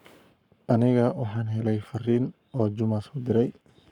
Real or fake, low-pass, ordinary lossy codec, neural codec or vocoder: fake; 19.8 kHz; none; codec, 44.1 kHz, 7.8 kbps, Pupu-Codec